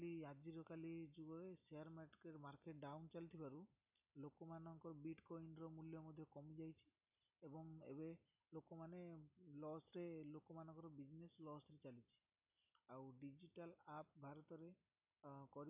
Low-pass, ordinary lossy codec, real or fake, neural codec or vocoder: 3.6 kHz; none; real; none